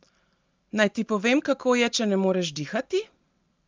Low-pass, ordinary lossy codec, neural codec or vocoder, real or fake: 7.2 kHz; Opus, 32 kbps; none; real